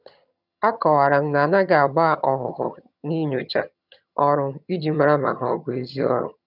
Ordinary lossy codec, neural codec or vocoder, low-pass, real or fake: none; vocoder, 22.05 kHz, 80 mel bands, HiFi-GAN; 5.4 kHz; fake